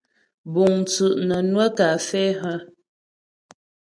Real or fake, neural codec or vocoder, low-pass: real; none; 9.9 kHz